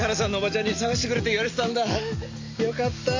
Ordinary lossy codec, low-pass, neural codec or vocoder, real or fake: AAC, 48 kbps; 7.2 kHz; none; real